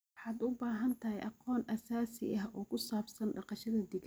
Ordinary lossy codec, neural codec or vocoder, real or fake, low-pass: none; none; real; none